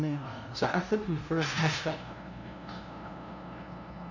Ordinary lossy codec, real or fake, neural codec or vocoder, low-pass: none; fake; codec, 16 kHz, 0.5 kbps, FunCodec, trained on LibriTTS, 25 frames a second; 7.2 kHz